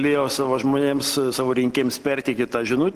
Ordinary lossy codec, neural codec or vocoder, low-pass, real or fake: Opus, 16 kbps; none; 14.4 kHz; real